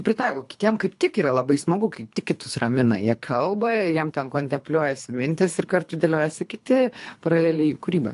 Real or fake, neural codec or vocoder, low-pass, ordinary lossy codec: fake; codec, 24 kHz, 3 kbps, HILCodec; 10.8 kHz; AAC, 64 kbps